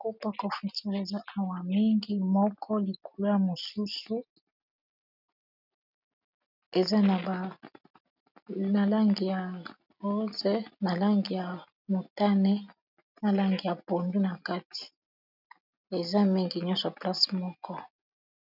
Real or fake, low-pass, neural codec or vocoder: real; 5.4 kHz; none